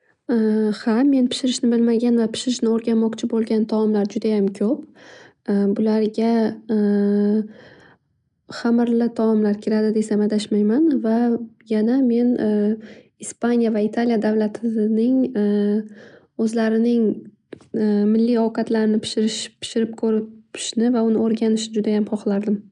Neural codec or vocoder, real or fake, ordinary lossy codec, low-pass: none; real; none; 10.8 kHz